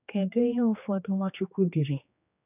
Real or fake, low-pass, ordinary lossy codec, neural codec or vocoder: fake; 3.6 kHz; none; codec, 16 kHz, 2 kbps, X-Codec, HuBERT features, trained on general audio